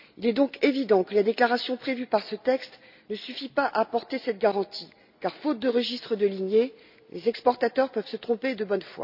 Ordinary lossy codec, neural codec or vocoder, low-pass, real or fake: none; none; 5.4 kHz; real